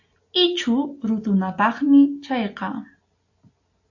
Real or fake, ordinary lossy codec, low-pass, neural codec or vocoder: real; AAC, 48 kbps; 7.2 kHz; none